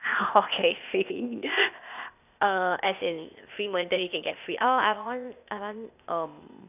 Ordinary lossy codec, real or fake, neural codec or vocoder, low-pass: none; fake; codec, 16 kHz, 0.8 kbps, ZipCodec; 3.6 kHz